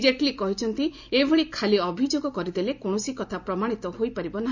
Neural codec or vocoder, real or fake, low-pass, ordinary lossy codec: none; real; 7.2 kHz; none